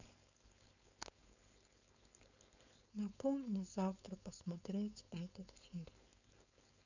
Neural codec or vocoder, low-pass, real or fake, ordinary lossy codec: codec, 16 kHz, 4.8 kbps, FACodec; 7.2 kHz; fake; none